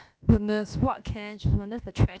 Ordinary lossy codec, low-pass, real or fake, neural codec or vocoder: none; none; fake; codec, 16 kHz, about 1 kbps, DyCAST, with the encoder's durations